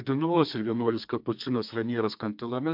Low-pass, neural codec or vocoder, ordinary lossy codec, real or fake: 5.4 kHz; codec, 32 kHz, 1.9 kbps, SNAC; MP3, 48 kbps; fake